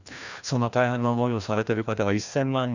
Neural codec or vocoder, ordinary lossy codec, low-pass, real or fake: codec, 16 kHz, 1 kbps, FreqCodec, larger model; none; 7.2 kHz; fake